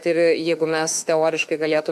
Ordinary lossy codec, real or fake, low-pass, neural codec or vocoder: AAC, 96 kbps; fake; 14.4 kHz; autoencoder, 48 kHz, 32 numbers a frame, DAC-VAE, trained on Japanese speech